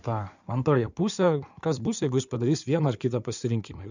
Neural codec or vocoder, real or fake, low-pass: codec, 16 kHz in and 24 kHz out, 2.2 kbps, FireRedTTS-2 codec; fake; 7.2 kHz